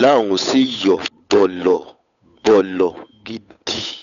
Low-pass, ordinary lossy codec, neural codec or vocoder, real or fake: 7.2 kHz; none; codec, 16 kHz, 8 kbps, FunCodec, trained on Chinese and English, 25 frames a second; fake